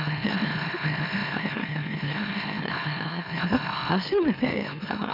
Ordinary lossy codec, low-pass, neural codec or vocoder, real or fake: none; 5.4 kHz; autoencoder, 44.1 kHz, a latent of 192 numbers a frame, MeloTTS; fake